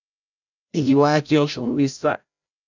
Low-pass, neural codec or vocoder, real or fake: 7.2 kHz; codec, 16 kHz, 0.5 kbps, FreqCodec, larger model; fake